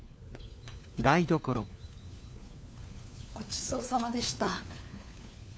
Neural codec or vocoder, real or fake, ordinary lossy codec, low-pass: codec, 16 kHz, 4 kbps, FunCodec, trained on LibriTTS, 50 frames a second; fake; none; none